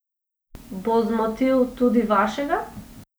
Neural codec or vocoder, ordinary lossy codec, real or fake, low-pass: none; none; real; none